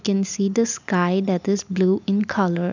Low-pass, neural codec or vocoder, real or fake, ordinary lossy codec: 7.2 kHz; none; real; none